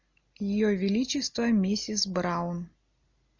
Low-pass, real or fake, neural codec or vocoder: 7.2 kHz; real; none